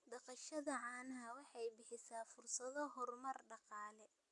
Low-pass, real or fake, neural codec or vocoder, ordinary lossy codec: 10.8 kHz; real; none; AAC, 64 kbps